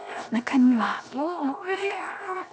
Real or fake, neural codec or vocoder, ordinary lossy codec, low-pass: fake; codec, 16 kHz, 0.7 kbps, FocalCodec; none; none